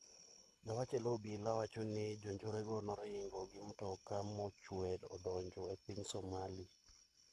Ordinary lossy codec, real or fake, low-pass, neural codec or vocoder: none; fake; none; codec, 24 kHz, 6 kbps, HILCodec